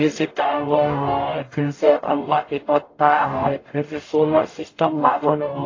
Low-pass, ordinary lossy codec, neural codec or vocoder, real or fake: 7.2 kHz; AAC, 32 kbps; codec, 44.1 kHz, 0.9 kbps, DAC; fake